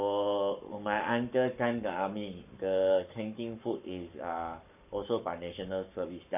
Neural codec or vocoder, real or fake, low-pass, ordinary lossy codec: codec, 44.1 kHz, 7.8 kbps, Pupu-Codec; fake; 3.6 kHz; none